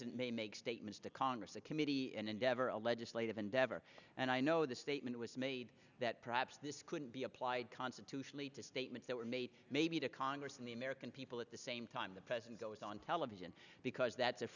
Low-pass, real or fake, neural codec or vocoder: 7.2 kHz; real; none